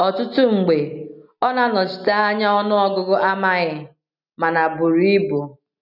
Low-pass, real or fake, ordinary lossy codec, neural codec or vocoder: 5.4 kHz; real; none; none